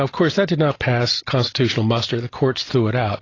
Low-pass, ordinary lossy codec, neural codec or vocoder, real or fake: 7.2 kHz; AAC, 32 kbps; none; real